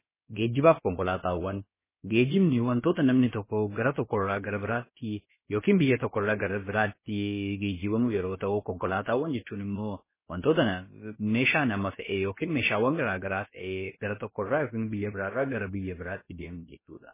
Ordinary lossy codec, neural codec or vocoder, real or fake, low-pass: MP3, 16 kbps; codec, 16 kHz, about 1 kbps, DyCAST, with the encoder's durations; fake; 3.6 kHz